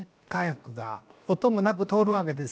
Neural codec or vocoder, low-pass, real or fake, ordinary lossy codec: codec, 16 kHz, 0.7 kbps, FocalCodec; none; fake; none